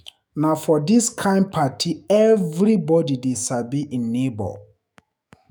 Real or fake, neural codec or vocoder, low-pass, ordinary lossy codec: fake; autoencoder, 48 kHz, 128 numbers a frame, DAC-VAE, trained on Japanese speech; none; none